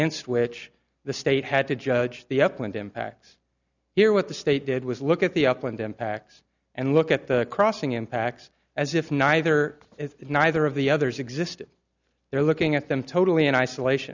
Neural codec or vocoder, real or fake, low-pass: none; real; 7.2 kHz